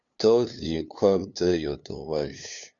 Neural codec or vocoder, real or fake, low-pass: codec, 16 kHz, 2 kbps, FunCodec, trained on LibriTTS, 25 frames a second; fake; 7.2 kHz